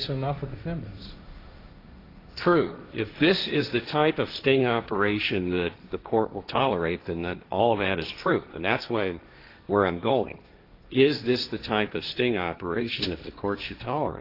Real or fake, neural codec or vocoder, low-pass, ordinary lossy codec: fake; codec, 16 kHz, 1.1 kbps, Voila-Tokenizer; 5.4 kHz; AAC, 32 kbps